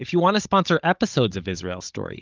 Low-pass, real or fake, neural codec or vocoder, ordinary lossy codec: 7.2 kHz; fake; codec, 16 kHz, 16 kbps, FunCodec, trained on Chinese and English, 50 frames a second; Opus, 32 kbps